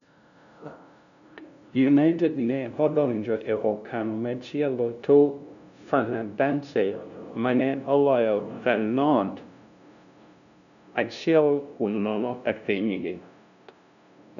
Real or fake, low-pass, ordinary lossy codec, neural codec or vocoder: fake; 7.2 kHz; none; codec, 16 kHz, 0.5 kbps, FunCodec, trained on LibriTTS, 25 frames a second